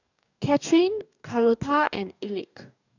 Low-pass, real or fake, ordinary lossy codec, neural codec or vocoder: 7.2 kHz; fake; none; codec, 44.1 kHz, 2.6 kbps, DAC